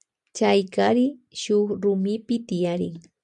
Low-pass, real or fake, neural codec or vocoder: 10.8 kHz; real; none